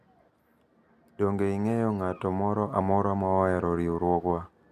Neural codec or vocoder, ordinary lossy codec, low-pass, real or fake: none; none; 14.4 kHz; real